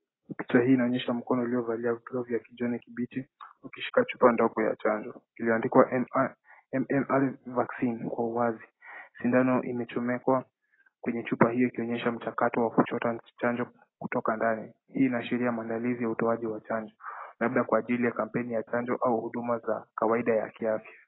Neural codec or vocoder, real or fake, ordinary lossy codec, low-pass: none; real; AAC, 16 kbps; 7.2 kHz